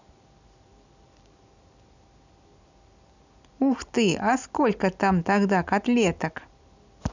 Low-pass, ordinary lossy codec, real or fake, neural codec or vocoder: 7.2 kHz; none; real; none